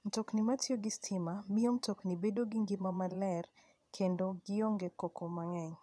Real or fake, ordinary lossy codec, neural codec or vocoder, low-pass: fake; none; vocoder, 22.05 kHz, 80 mel bands, Vocos; none